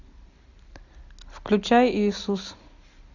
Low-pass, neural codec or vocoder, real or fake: 7.2 kHz; none; real